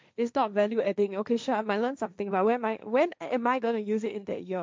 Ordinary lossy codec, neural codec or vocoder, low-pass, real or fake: none; codec, 16 kHz, 1.1 kbps, Voila-Tokenizer; none; fake